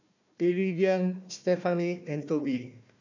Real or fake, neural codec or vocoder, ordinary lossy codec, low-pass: fake; codec, 16 kHz, 1 kbps, FunCodec, trained on Chinese and English, 50 frames a second; none; 7.2 kHz